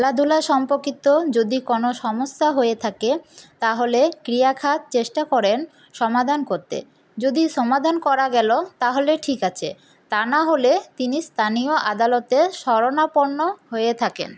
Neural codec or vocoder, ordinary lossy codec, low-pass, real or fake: none; none; none; real